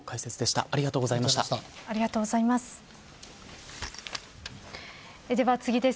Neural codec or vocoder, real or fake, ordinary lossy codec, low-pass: none; real; none; none